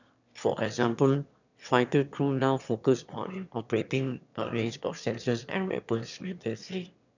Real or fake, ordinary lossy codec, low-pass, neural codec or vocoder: fake; none; 7.2 kHz; autoencoder, 22.05 kHz, a latent of 192 numbers a frame, VITS, trained on one speaker